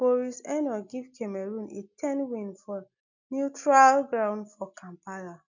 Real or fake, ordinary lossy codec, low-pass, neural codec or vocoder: real; none; 7.2 kHz; none